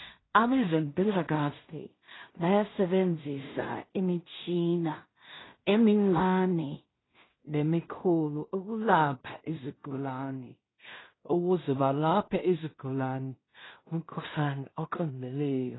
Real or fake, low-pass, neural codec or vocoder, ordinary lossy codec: fake; 7.2 kHz; codec, 16 kHz in and 24 kHz out, 0.4 kbps, LongCat-Audio-Codec, two codebook decoder; AAC, 16 kbps